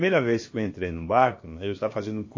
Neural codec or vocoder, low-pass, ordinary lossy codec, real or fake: codec, 16 kHz, about 1 kbps, DyCAST, with the encoder's durations; 7.2 kHz; MP3, 32 kbps; fake